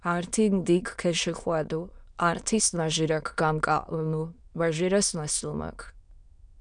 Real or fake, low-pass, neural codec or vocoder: fake; 9.9 kHz; autoencoder, 22.05 kHz, a latent of 192 numbers a frame, VITS, trained on many speakers